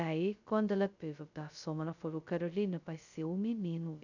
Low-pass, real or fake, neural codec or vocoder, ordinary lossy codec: 7.2 kHz; fake; codec, 16 kHz, 0.2 kbps, FocalCodec; none